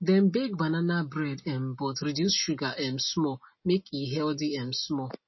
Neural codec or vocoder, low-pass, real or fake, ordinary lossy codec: none; 7.2 kHz; real; MP3, 24 kbps